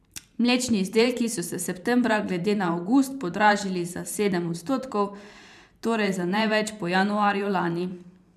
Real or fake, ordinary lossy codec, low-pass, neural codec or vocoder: fake; none; 14.4 kHz; vocoder, 44.1 kHz, 128 mel bands every 512 samples, BigVGAN v2